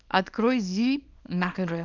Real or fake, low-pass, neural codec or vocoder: fake; 7.2 kHz; codec, 24 kHz, 0.9 kbps, WavTokenizer, small release